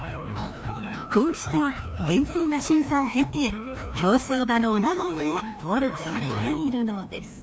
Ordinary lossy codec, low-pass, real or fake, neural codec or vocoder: none; none; fake; codec, 16 kHz, 1 kbps, FreqCodec, larger model